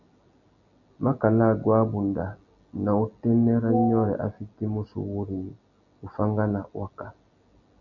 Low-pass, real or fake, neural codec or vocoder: 7.2 kHz; real; none